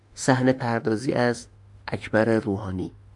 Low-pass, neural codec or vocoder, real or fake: 10.8 kHz; autoencoder, 48 kHz, 32 numbers a frame, DAC-VAE, trained on Japanese speech; fake